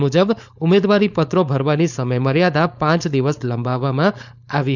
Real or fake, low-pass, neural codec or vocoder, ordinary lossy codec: fake; 7.2 kHz; codec, 16 kHz, 4.8 kbps, FACodec; none